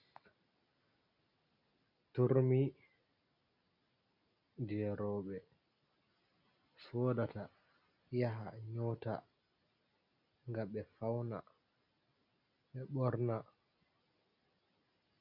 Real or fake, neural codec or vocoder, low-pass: real; none; 5.4 kHz